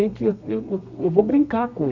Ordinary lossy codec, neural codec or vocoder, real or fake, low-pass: none; codec, 32 kHz, 1.9 kbps, SNAC; fake; 7.2 kHz